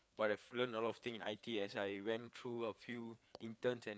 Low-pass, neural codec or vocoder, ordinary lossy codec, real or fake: none; codec, 16 kHz, 4 kbps, FreqCodec, larger model; none; fake